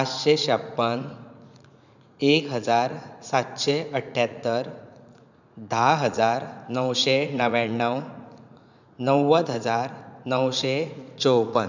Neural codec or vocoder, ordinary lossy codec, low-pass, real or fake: vocoder, 44.1 kHz, 80 mel bands, Vocos; none; 7.2 kHz; fake